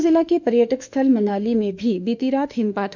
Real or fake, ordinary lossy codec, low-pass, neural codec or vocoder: fake; none; 7.2 kHz; autoencoder, 48 kHz, 32 numbers a frame, DAC-VAE, trained on Japanese speech